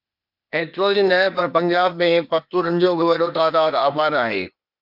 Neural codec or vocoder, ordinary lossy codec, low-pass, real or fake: codec, 16 kHz, 0.8 kbps, ZipCodec; MP3, 48 kbps; 5.4 kHz; fake